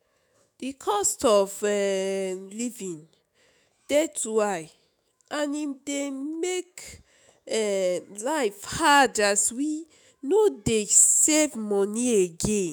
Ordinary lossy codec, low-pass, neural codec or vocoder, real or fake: none; none; autoencoder, 48 kHz, 128 numbers a frame, DAC-VAE, trained on Japanese speech; fake